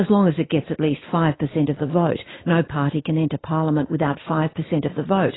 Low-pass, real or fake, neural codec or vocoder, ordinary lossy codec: 7.2 kHz; real; none; AAC, 16 kbps